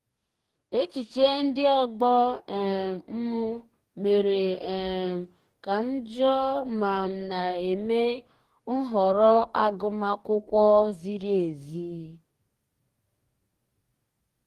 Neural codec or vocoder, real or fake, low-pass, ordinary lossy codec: codec, 44.1 kHz, 2.6 kbps, DAC; fake; 14.4 kHz; Opus, 24 kbps